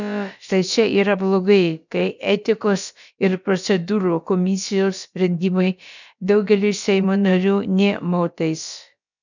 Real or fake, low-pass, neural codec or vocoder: fake; 7.2 kHz; codec, 16 kHz, about 1 kbps, DyCAST, with the encoder's durations